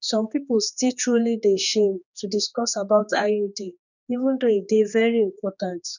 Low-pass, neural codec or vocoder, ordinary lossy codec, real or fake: 7.2 kHz; codec, 16 kHz, 2 kbps, X-Codec, HuBERT features, trained on general audio; none; fake